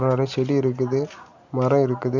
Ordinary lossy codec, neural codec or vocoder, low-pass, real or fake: none; none; 7.2 kHz; real